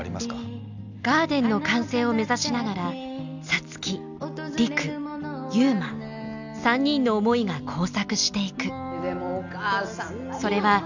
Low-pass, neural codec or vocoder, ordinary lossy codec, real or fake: 7.2 kHz; none; none; real